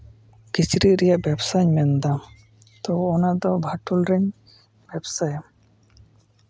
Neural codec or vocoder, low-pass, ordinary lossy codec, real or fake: none; none; none; real